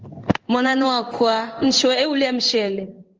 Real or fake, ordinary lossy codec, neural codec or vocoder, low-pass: fake; Opus, 24 kbps; codec, 16 kHz in and 24 kHz out, 1 kbps, XY-Tokenizer; 7.2 kHz